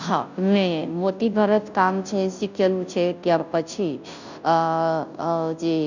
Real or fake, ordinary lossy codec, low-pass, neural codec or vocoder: fake; none; 7.2 kHz; codec, 16 kHz, 0.5 kbps, FunCodec, trained on Chinese and English, 25 frames a second